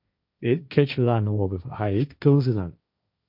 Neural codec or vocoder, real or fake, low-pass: codec, 16 kHz, 1.1 kbps, Voila-Tokenizer; fake; 5.4 kHz